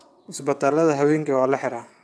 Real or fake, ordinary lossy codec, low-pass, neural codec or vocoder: fake; none; none; vocoder, 22.05 kHz, 80 mel bands, WaveNeXt